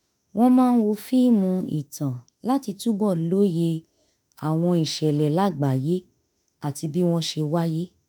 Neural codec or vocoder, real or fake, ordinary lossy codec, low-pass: autoencoder, 48 kHz, 32 numbers a frame, DAC-VAE, trained on Japanese speech; fake; none; none